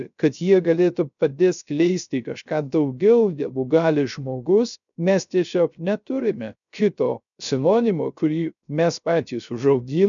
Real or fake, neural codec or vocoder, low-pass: fake; codec, 16 kHz, 0.3 kbps, FocalCodec; 7.2 kHz